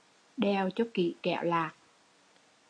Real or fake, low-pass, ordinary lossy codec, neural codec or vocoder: real; 9.9 kHz; AAC, 48 kbps; none